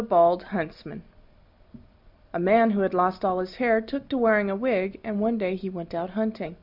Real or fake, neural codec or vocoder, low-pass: real; none; 5.4 kHz